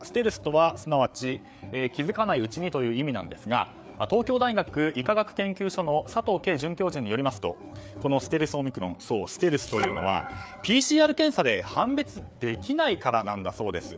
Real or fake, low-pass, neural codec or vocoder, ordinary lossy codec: fake; none; codec, 16 kHz, 4 kbps, FreqCodec, larger model; none